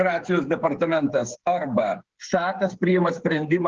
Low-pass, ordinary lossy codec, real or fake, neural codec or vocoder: 7.2 kHz; Opus, 16 kbps; fake; codec, 16 kHz, 8 kbps, FreqCodec, smaller model